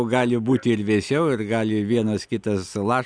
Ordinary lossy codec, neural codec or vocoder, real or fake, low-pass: Opus, 64 kbps; none; real; 9.9 kHz